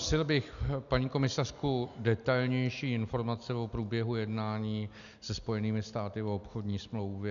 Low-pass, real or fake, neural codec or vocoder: 7.2 kHz; real; none